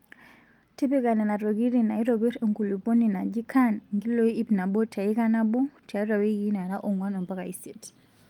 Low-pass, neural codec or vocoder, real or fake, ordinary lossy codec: 19.8 kHz; none; real; Opus, 32 kbps